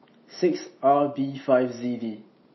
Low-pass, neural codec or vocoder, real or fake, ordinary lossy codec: 7.2 kHz; none; real; MP3, 24 kbps